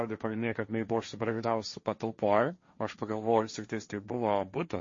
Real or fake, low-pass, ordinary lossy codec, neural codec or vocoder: fake; 7.2 kHz; MP3, 32 kbps; codec, 16 kHz, 1.1 kbps, Voila-Tokenizer